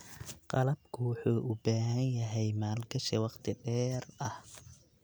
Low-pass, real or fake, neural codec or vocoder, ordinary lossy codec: none; real; none; none